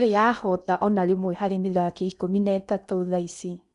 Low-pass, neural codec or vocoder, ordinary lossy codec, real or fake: 10.8 kHz; codec, 16 kHz in and 24 kHz out, 0.8 kbps, FocalCodec, streaming, 65536 codes; none; fake